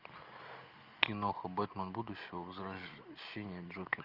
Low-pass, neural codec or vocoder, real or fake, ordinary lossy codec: 5.4 kHz; none; real; Opus, 32 kbps